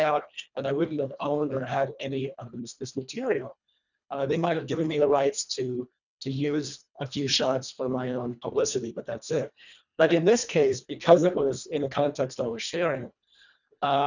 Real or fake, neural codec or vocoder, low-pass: fake; codec, 24 kHz, 1.5 kbps, HILCodec; 7.2 kHz